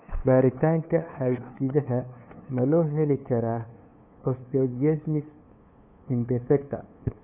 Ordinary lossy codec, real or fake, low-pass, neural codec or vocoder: none; fake; 3.6 kHz; codec, 16 kHz, 2 kbps, FunCodec, trained on LibriTTS, 25 frames a second